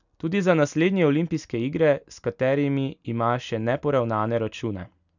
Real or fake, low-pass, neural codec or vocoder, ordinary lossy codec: real; 7.2 kHz; none; none